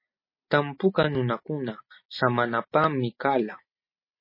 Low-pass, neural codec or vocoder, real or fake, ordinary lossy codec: 5.4 kHz; none; real; MP3, 24 kbps